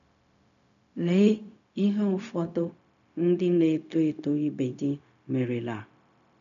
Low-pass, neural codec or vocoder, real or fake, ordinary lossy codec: 7.2 kHz; codec, 16 kHz, 0.4 kbps, LongCat-Audio-Codec; fake; none